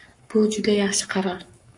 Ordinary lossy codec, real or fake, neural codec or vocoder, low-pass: MP3, 64 kbps; fake; codec, 44.1 kHz, 7.8 kbps, Pupu-Codec; 10.8 kHz